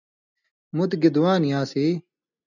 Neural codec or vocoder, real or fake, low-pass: none; real; 7.2 kHz